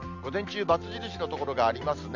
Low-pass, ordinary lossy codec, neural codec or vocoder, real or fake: 7.2 kHz; none; none; real